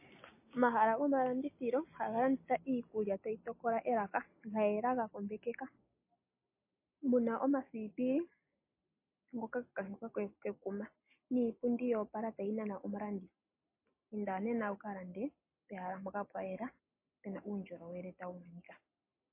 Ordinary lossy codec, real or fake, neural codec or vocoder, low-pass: MP3, 24 kbps; real; none; 3.6 kHz